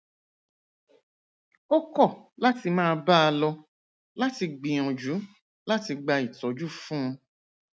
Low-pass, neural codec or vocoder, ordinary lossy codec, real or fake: none; none; none; real